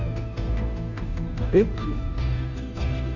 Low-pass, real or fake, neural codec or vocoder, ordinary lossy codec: 7.2 kHz; fake; codec, 16 kHz, 0.5 kbps, FunCodec, trained on Chinese and English, 25 frames a second; none